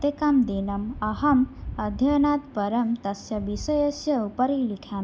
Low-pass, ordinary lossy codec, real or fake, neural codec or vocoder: none; none; real; none